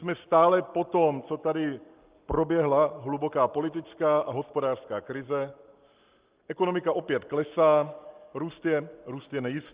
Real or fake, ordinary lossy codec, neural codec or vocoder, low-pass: real; Opus, 24 kbps; none; 3.6 kHz